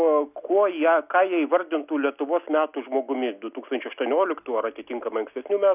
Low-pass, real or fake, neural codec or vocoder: 3.6 kHz; real; none